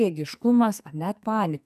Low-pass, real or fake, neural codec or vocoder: 14.4 kHz; fake; codec, 44.1 kHz, 2.6 kbps, SNAC